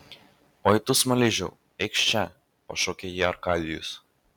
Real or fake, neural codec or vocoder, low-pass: real; none; 19.8 kHz